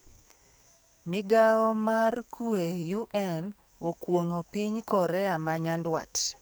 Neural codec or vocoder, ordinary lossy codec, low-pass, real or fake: codec, 44.1 kHz, 2.6 kbps, SNAC; none; none; fake